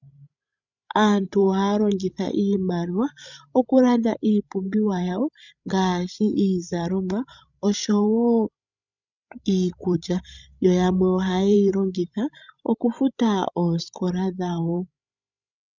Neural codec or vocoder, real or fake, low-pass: codec, 16 kHz, 16 kbps, FreqCodec, larger model; fake; 7.2 kHz